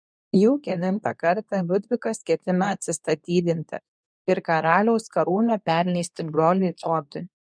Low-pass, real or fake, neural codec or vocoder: 9.9 kHz; fake; codec, 24 kHz, 0.9 kbps, WavTokenizer, medium speech release version 2